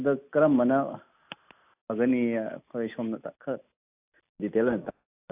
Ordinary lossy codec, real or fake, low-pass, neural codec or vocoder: AAC, 32 kbps; real; 3.6 kHz; none